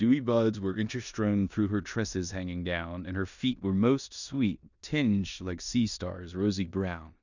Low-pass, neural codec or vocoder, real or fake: 7.2 kHz; codec, 16 kHz in and 24 kHz out, 0.9 kbps, LongCat-Audio-Codec, four codebook decoder; fake